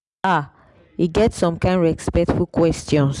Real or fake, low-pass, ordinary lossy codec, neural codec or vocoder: real; 10.8 kHz; none; none